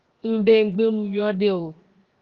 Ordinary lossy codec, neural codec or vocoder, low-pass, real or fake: Opus, 32 kbps; codec, 16 kHz, 0.7 kbps, FocalCodec; 7.2 kHz; fake